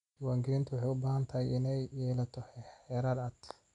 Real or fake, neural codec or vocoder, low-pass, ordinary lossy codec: real; none; 10.8 kHz; none